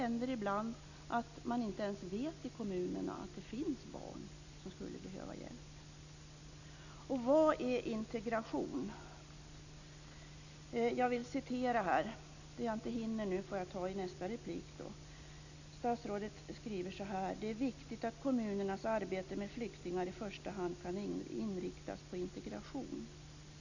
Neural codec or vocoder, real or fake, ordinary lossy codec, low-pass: none; real; none; 7.2 kHz